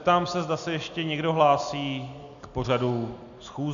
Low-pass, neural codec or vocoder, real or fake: 7.2 kHz; none; real